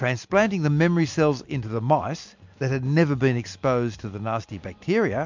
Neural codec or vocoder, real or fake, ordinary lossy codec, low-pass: none; real; MP3, 64 kbps; 7.2 kHz